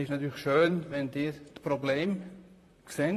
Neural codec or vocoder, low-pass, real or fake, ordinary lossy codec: vocoder, 44.1 kHz, 128 mel bands, Pupu-Vocoder; 14.4 kHz; fake; AAC, 48 kbps